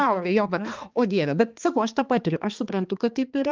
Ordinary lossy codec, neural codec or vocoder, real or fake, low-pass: Opus, 24 kbps; codec, 16 kHz, 2 kbps, X-Codec, HuBERT features, trained on balanced general audio; fake; 7.2 kHz